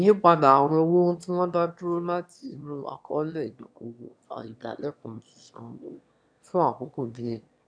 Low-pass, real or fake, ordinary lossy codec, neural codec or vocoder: 9.9 kHz; fake; none; autoencoder, 22.05 kHz, a latent of 192 numbers a frame, VITS, trained on one speaker